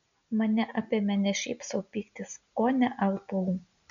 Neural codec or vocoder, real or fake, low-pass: none; real; 7.2 kHz